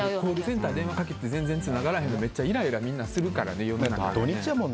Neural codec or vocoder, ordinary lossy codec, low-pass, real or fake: none; none; none; real